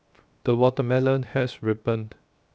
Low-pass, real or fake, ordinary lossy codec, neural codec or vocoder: none; fake; none; codec, 16 kHz, 0.7 kbps, FocalCodec